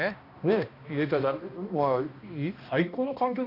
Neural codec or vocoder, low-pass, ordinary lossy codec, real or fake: codec, 16 kHz, 1 kbps, X-Codec, HuBERT features, trained on balanced general audio; 5.4 kHz; none; fake